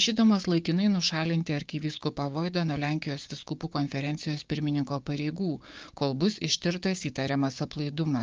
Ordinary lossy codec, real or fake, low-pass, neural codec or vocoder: Opus, 24 kbps; fake; 7.2 kHz; codec, 16 kHz, 6 kbps, DAC